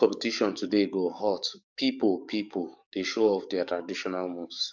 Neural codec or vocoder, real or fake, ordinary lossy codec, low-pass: codec, 44.1 kHz, 7.8 kbps, DAC; fake; none; 7.2 kHz